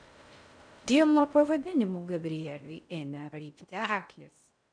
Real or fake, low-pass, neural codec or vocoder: fake; 9.9 kHz; codec, 16 kHz in and 24 kHz out, 0.6 kbps, FocalCodec, streaming, 4096 codes